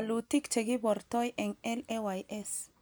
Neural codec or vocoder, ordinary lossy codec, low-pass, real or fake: none; none; none; real